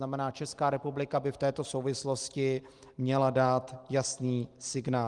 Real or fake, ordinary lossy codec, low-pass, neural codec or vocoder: real; Opus, 24 kbps; 10.8 kHz; none